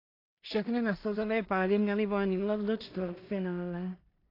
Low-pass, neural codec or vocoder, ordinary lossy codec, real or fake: 5.4 kHz; codec, 16 kHz in and 24 kHz out, 0.4 kbps, LongCat-Audio-Codec, two codebook decoder; AAC, 32 kbps; fake